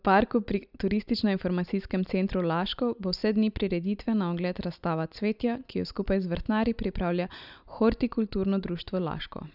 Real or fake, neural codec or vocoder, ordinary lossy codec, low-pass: real; none; none; 5.4 kHz